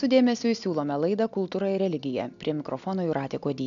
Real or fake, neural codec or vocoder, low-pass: real; none; 7.2 kHz